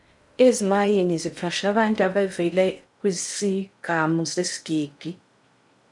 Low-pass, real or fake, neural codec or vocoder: 10.8 kHz; fake; codec, 16 kHz in and 24 kHz out, 0.6 kbps, FocalCodec, streaming, 2048 codes